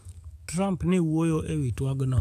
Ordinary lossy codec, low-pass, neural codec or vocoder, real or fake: none; 14.4 kHz; codec, 44.1 kHz, 7.8 kbps, DAC; fake